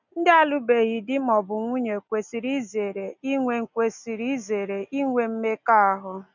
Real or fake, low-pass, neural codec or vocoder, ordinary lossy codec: real; 7.2 kHz; none; none